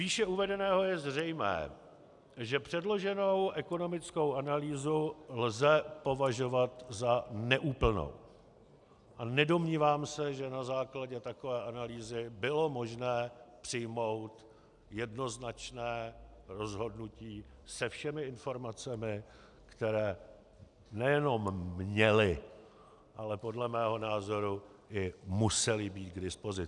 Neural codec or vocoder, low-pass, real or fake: none; 10.8 kHz; real